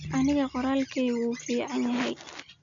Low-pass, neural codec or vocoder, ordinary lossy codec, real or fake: 7.2 kHz; none; MP3, 64 kbps; real